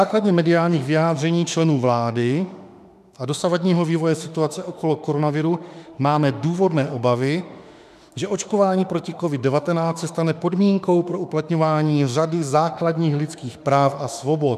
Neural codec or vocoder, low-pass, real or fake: autoencoder, 48 kHz, 32 numbers a frame, DAC-VAE, trained on Japanese speech; 14.4 kHz; fake